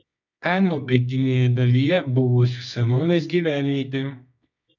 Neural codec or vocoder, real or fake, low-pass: codec, 24 kHz, 0.9 kbps, WavTokenizer, medium music audio release; fake; 7.2 kHz